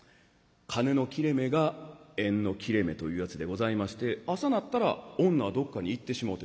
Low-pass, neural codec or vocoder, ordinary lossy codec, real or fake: none; none; none; real